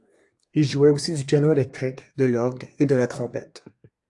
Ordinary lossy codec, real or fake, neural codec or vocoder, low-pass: AAC, 64 kbps; fake; codec, 24 kHz, 1 kbps, SNAC; 10.8 kHz